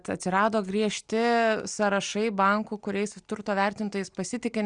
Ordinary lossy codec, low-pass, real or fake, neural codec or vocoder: Opus, 64 kbps; 9.9 kHz; real; none